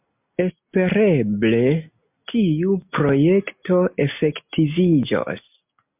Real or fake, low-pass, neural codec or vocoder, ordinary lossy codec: real; 3.6 kHz; none; MP3, 32 kbps